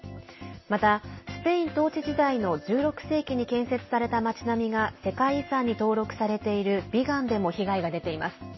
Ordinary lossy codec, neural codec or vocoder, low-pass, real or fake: MP3, 24 kbps; none; 7.2 kHz; real